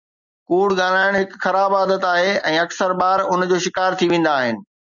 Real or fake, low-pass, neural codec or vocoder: real; 7.2 kHz; none